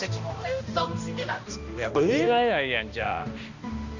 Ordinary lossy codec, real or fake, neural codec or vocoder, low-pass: none; fake; codec, 16 kHz, 1 kbps, X-Codec, HuBERT features, trained on balanced general audio; 7.2 kHz